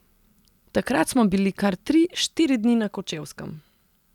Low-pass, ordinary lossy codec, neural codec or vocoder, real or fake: 19.8 kHz; none; none; real